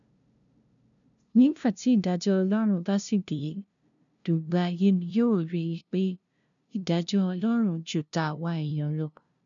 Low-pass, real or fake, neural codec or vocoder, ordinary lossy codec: 7.2 kHz; fake; codec, 16 kHz, 0.5 kbps, FunCodec, trained on LibriTTS, 25 frames a second; none